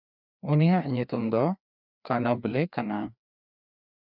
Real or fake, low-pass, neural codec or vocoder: fake; 5.4 kHz; codec, 16 kHz, 2 kbps, FreqCodec, larger model